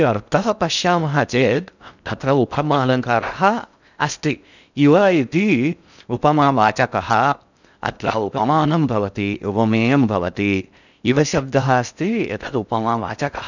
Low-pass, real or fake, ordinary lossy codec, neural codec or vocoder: 7.2 kHz; fake; none; codec, 16 kHz in and 24 kHz out, 0.6 kbps, FocalCodec, streaming, 2048 codes